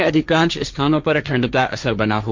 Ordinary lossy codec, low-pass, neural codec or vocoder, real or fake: MP3, 64 kbps; 7.2 kHz; codec, 16 kHz, 1.1 kbps, Voila-Tokenizer; fake